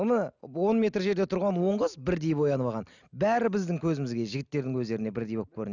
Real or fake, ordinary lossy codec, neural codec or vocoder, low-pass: real; none; none; 7.2 kHz